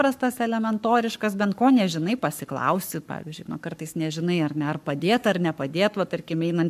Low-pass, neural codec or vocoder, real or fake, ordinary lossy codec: 14.4 kHz; codec, 44.1 kHz, 7.8 kbps, Pupu-Codec; fake; MP3, 96 kbps